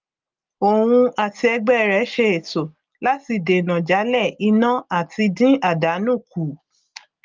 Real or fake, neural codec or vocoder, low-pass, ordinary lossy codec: real; none; 7.2 kHz; Opus, 24 kbps